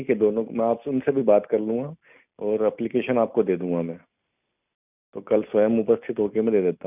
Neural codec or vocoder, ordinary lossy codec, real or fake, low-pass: none; none; real; 3.6 kHz